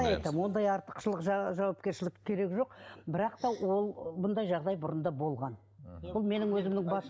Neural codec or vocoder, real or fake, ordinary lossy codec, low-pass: none; real; none; none